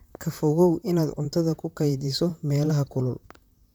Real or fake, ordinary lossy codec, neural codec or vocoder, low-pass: fake; none; vocoder, 44.1 kHz, 128 mel bands, Pupu-Vocoder; none